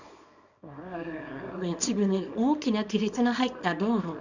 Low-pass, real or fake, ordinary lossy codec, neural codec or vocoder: 7.2 kHz; fake; none; codec, 24 kHz, 0.9 kbps, WavTokenizer, small release